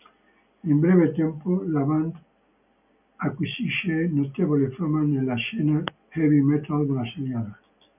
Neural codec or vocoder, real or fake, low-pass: none; real; 3.6 kHz